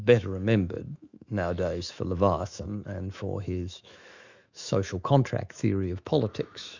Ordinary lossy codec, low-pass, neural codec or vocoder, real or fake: Opus, 64 kbps; 7.2 kHz; autoencoder, 48 kHz, 128 numbers a frame, DAC-VAE, trained on Japanese speech; fake